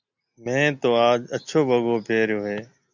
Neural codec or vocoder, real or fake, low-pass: none; real; 7.2 kHz